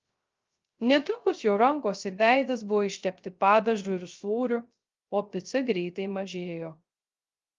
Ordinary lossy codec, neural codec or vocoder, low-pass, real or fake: Opus, 32 kbps; codec, 16 kHz, 0.3 kbps, FocalCodec; 7.2 kHz; fake